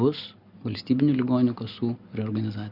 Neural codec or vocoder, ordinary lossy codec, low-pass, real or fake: none; Opus, 64 kbps; 5.4 kHz; real